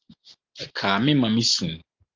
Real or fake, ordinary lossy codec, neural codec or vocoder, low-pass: real; Opus, 24 kbps; none; 7.2 kHz